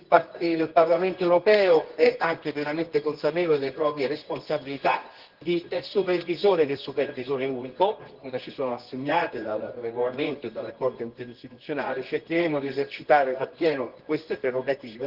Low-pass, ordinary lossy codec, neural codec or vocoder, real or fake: 5.4 kHz; Opus, 16 kbps; codec, 24 kHz, 0.9 kbps, WavTokenizer, medium music audio release; fake